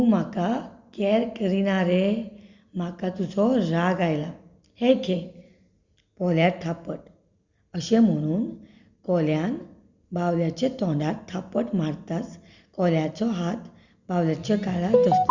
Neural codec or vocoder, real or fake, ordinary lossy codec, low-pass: none; real; Opus, 64 kbps; 7.2 kHz